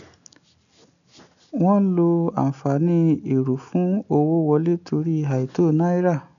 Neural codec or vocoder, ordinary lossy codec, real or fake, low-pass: none; none; real; 7.2 kHz